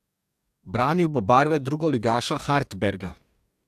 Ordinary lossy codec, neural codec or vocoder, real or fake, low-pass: AAC, 96 kbps; codec, 44.1 kHz, 2.6 kbps, DAC; fake; 14.4 kHz